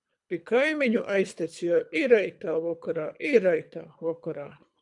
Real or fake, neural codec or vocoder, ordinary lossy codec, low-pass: fake; codec, 24 kHz, 3 kbps, HILCodec; MP3, 96 kbps; 10.8 kHz